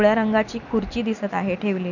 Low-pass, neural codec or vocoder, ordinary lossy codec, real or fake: 7.2 kHz; none; none; real